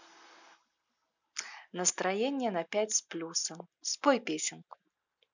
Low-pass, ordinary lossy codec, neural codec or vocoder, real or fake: 7.2 kHz; none; none; real